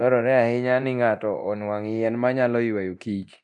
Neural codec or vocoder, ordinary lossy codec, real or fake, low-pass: codec, 24 kHz, 0.9 kbps, DualCodec; none; fake; none